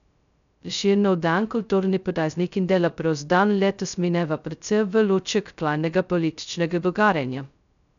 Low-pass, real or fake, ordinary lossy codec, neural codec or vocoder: 7.2 kHz; fake; none; codec, 16 kHz, 0.2 kbps, FocalCodec